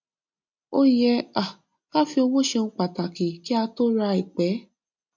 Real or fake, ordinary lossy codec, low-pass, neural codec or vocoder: real; MP3, 48 kbps; 7.2 kHz; none